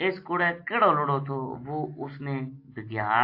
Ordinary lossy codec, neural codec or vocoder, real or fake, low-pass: AAC, 32 kbps; none; real; 5.4 kHz